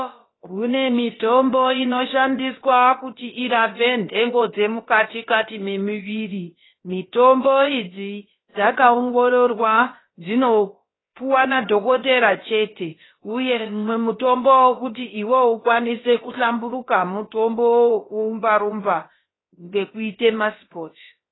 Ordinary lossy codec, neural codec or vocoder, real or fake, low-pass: AAC, 16 kbps; codec, 16 kHz, about 1 kbps, DyCAST, with the encoder's durations; fake; 7.2 kHz